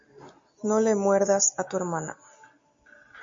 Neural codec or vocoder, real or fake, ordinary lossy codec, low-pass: none; real; MP3, 48 kbps; 7.2 kHz